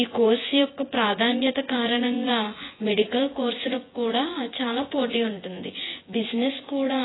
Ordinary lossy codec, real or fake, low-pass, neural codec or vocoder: AAC, 16 kbps; fake; 7.2 kHz; vocoder, 24 kHz, 100 mel bands, Vocos